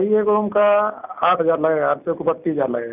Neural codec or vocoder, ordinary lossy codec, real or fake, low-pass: none; none; real; 3.6 kHz